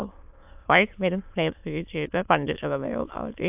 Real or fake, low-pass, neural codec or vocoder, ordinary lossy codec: fake; 3.6 kHz; autoencoder, 22.05 kHz, a latent of 192 numbers a frame, VITS, trained on many speakers; AAC, 32 kbps